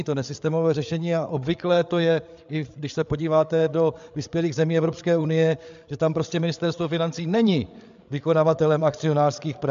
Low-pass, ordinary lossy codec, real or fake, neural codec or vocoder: 7.2 kHz; MP3, 64 kbps; fake; codec, 16 kHz, 8 kbps, FreqCodec, larger model